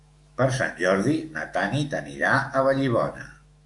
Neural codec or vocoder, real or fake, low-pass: autoencoder, 48 kHz, 128 numbers a frame, DAC-VAE, trained on Japanese speech; fake; 10.8 kHz